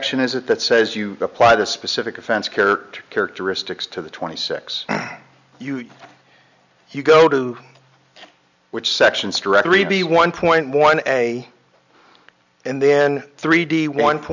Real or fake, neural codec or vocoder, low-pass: real; none; 7.2 kHz